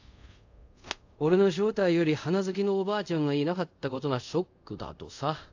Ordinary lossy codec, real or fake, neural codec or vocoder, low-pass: AAC, 48 kbps; fake; codec, 24 kHz, 0.5 kbps, DualCodec; 7.2 kHz